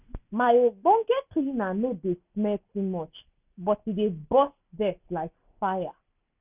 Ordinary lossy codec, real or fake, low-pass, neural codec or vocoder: none; real; 3.6 kHz; none